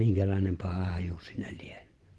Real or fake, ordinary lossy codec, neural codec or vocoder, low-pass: fake; Opus, 16 kbps; vocoder, 24 kHz, 100 mel bands, Vocos; 10.8 kHz